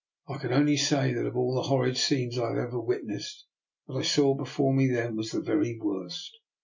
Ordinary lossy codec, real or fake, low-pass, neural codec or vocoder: MP3, 48 kbps; real; 7.2 kHz; none